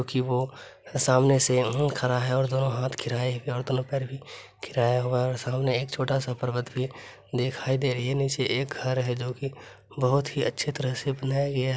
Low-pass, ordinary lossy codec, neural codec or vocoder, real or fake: none; none; none; real